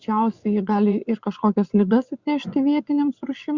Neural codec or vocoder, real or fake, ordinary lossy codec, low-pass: vocoder, 24 kHz, 100 mel bands, Vocos; fake; Opus, 64 kbps; 7.2 kHz